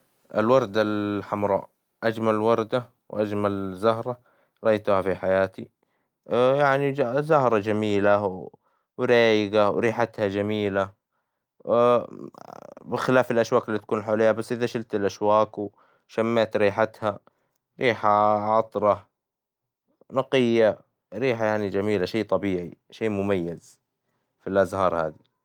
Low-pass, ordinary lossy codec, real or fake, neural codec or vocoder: 19.8 kHz; Opus, 32 kbps; real; none